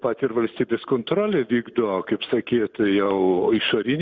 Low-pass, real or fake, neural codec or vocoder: 7.2 kHz; real; none